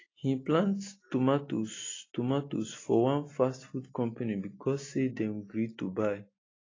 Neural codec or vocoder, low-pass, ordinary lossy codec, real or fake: none; 7.2 kHz; AAC, 32 kbps; real